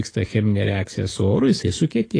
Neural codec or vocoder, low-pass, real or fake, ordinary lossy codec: codec, 44.1 kHz, 7.8 kbps, DAC; 9.9 kHz; fake; AAC, 32 kbps